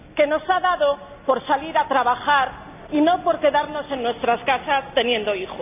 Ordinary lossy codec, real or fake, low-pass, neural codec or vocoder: none; real; 3.6 kHz; none